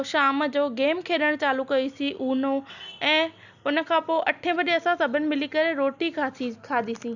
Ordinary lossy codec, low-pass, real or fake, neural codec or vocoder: none; 7.2 kHz; real; none